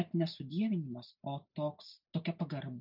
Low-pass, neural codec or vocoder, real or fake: 5.4 kHz; none; real